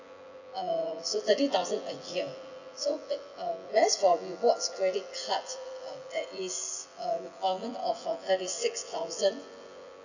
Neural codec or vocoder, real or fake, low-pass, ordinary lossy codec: vocoder, 24 kHz, 100 mel bands, Vocos; fake; 7.2 kHz; none